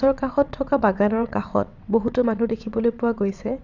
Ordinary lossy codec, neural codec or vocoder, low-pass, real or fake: Opus, 64 kbps; vocoder, 22.05 kHz, 80 mel bands, WaveNeXt; 7.2 kHz; fake